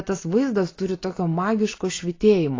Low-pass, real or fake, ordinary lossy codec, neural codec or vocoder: 7.2 kHz; real; AAC, 32 kbps; none